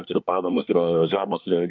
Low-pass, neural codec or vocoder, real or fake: 7.2 kHz; codec, 24 kHz, 1 kbps, SNAC; fake